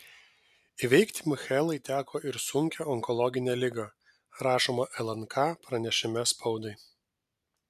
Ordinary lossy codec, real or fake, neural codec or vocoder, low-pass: MP3, 96 kbps; real; none; 14.4 kHz